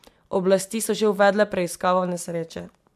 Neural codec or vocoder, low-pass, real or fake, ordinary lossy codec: none; 14.4 kHz; real; AAC, 96 kbps